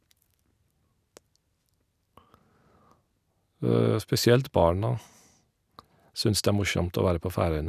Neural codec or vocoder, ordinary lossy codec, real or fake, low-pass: none; none; real; 14.4 kHz